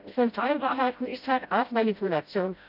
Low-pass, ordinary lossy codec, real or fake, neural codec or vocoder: 5.4 kHz; none; fake; codec, 16 kHz, 0.5 kbps, FreqCodec, smaller model